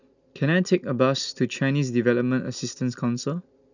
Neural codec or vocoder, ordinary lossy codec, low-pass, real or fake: none; none; 7.2 kHz; real